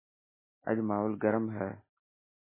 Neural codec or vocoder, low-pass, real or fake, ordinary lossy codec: none; 3.6 kHz; real; MP3, 16 kbps